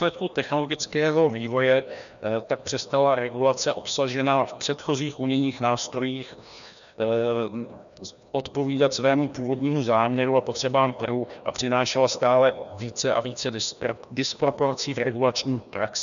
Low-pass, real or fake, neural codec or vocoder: 7.2 kHz; fake; codec, 16 kHz, 1 kbps, FreqCodec, larger model